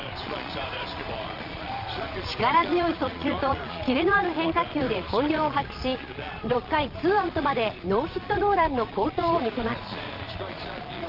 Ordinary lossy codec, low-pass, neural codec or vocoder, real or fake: Opus, 32 kbps; 5.4 kHz; vocoder, 22.05 kHz, 80 mel bands, Vocos; fake